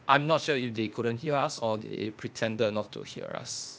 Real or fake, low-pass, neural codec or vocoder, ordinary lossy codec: fake; none; codec, 16 kHz, 0.8 kbps, ZipCodec; none